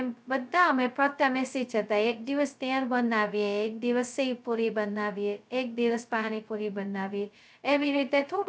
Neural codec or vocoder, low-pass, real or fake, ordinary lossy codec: codec, 16 kHz, 0.2 kbps, FocalCodec; none; fake; none